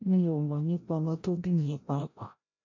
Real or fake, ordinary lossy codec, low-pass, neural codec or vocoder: fake; MP3, 48 kbps; 7.2 kHz; codec, 16 kHz, 0.5 kbps, FreqCodec, larger model